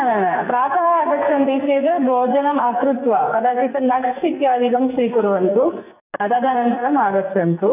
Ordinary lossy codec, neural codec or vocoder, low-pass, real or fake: AAC, 32 kbps; codec, 44.1 kHz, 2.6 kbps, SNAC; 3.6 kHz; fake